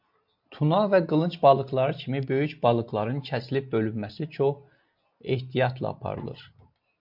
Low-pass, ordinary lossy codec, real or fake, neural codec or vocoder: 5.4 kHz; MP3, 48 kbps; real; none